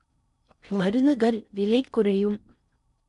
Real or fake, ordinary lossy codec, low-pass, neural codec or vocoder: fake; none; 10.8 kHz; codec, 16 kHz in and 24 kHz out, 0.6 kbps, FocalCodec, streaming, 4096 codes